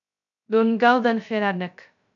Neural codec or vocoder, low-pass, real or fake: codec, 16 kHz, 0.2 kbps, FocalCodec; 7.2 kHz; fake